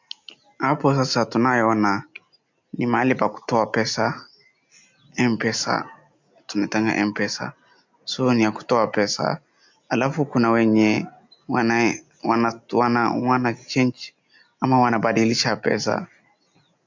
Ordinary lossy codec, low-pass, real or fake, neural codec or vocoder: MP3, 64 kbps; 7.2 kHz; real; none